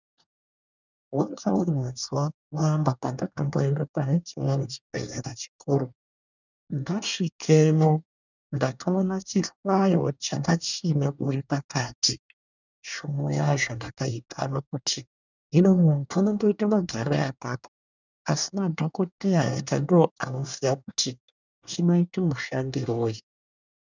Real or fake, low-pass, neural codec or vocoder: fake; 7.2 kHz; codec, 24 kHz, 1 kbps, SNAC